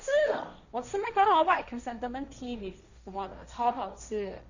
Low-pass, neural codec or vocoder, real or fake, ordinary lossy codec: 7.2 kHz; codec, 16 kHz, 1.1 kbps, Voila-Tokenizer; fake; none